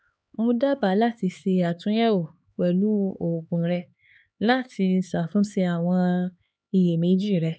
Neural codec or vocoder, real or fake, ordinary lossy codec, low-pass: codec, 16 kHz, 4 kbps, X-Codec, HuBERT features, trained on LibriSpeech; fake; none; none